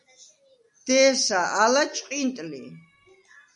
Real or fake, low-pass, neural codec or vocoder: real; 10.8 kHz; none